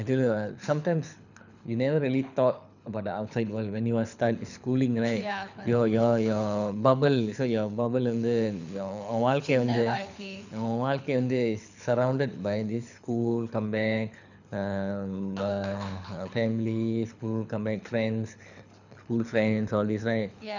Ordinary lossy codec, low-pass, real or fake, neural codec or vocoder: none; 7.2 kHz; fake; codec, 24 kHz, 6 kbps, HILCodec